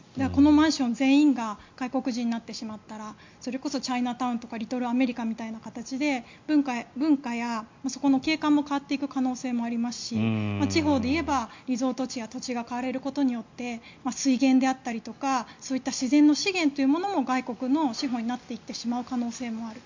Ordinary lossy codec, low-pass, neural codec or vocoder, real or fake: MP3, 48 kbps; 7.2 kHz; none; real